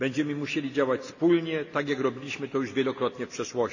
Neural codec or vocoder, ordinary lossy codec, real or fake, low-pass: none; none; real; 7.2 kHz